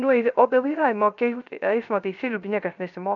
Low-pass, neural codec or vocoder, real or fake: 7.2 kHz; codec, 16 kHz, 0.3 kbps, FocalCodec; fake